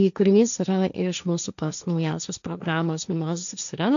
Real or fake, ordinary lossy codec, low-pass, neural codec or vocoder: fake; MP3, 96 kbps; 7.2 kHz; codec, 16 kHz, 1.1 kbps, Voila-Tokenizer